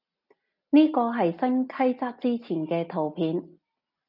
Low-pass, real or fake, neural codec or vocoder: 5.4 kHz; real; none